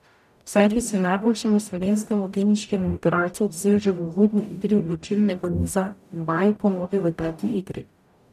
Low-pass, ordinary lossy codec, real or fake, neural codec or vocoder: 14.4 kHz; none; fake; codec, 44.1 kHz, 0.9 kbps, DAC